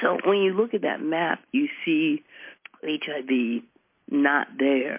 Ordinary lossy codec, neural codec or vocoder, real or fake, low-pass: MP3, 24 kbps; none; real; 3.6 kHz